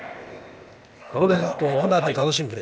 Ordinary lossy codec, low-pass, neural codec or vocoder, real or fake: none; none; codec, 16 kHz, 0.8 kbps, ZipCodec; fake